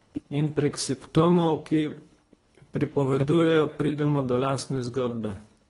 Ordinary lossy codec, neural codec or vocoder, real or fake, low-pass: AAC, 32 kbps; codec, 24 kHz, 1.5 kbps, HILCodec; fake; 10.8 kHz